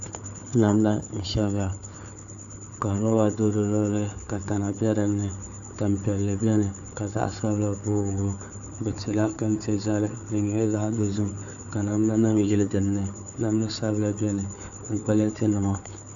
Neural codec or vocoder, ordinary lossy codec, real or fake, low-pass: codec, 16 kHz, 4 kbps, FunCodec, trained on Chinese and English, 50 frames a second; AAC, 64 kbps; fake; 7.2 kHz